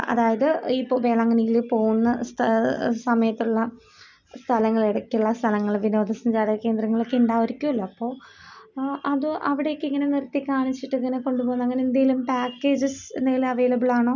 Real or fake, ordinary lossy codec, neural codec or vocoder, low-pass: real; none; none; 7.2 kHz